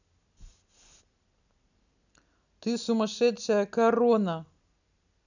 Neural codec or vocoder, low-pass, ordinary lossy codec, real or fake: none; 7.2 kHz; none; real